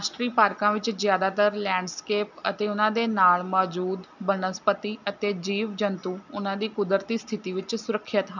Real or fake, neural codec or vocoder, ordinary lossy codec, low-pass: real; none; none; 7.2 kHz